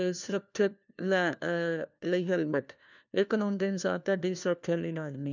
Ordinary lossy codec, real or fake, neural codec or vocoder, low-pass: none; fake; codec, 16 kHz, 1 kbps, FunCodec, trained on LibriTTS, 50 frames a second; 7.2 kHz